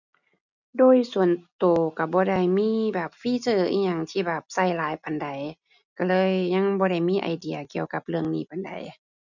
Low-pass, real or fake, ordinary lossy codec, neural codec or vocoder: 7.2 kHz; real; none; none